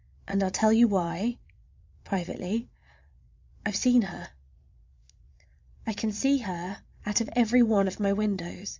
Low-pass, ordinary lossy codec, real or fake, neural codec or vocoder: 7.2 kHz; MP3, 64 kbps; fake; vocoder, 22.05 kHz, 80 mel bands, Vocos